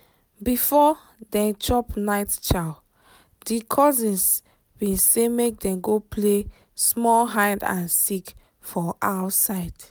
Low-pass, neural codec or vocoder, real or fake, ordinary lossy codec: none; none; real; none